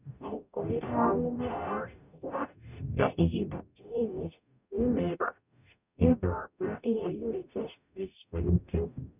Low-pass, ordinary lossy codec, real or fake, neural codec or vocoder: 3.6 kHz; none; fake; codec, 44.1 kHz, 0.9 kbps, DAC